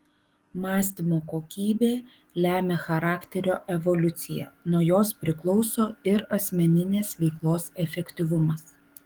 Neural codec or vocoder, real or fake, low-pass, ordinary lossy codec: codec, 44.1 kHz, 7.8 kbps, DAC; fake; 19.8 kHz; Opus, 24 kbps